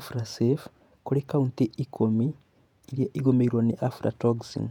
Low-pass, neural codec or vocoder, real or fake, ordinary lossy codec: 19.8 kHz; vocoder, 48 kHz, 128 mel bands, Vocos; fake; none